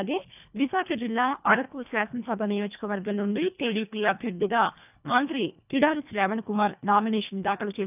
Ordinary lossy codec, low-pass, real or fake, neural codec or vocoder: none; 3.6 kHz; fake; codec, 24 kHz, 1.5 kbps, HILCodec